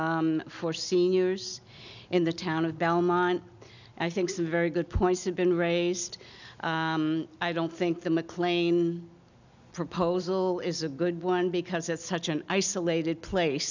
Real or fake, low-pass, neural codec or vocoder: real; 7.2 kHz; none